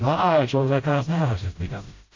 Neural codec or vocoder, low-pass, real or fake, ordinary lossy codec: codec, 16 kHz, 0.5 kbps, FreqCodec, smaller model; 7.2 kHz; fake; MP3, 48 kbps